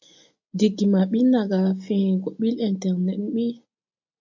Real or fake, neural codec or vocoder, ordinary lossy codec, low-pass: real; none; MP3, 48 kbps; 7.2 kHz